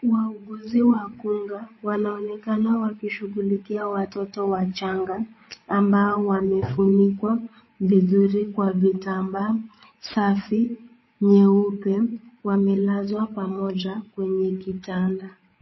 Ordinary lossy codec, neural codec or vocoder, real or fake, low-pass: MP3, 24 kbps; codec, 16 kHz, 8 kbps, FreqCodec, larger model; fake; 7.2 kHz